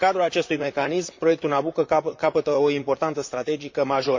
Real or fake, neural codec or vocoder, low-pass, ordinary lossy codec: fake; vocoder, 44.1 kHz, 128 mel bands, Pupu-Vocoder; 7.2 kHz; MP3, 48 kbps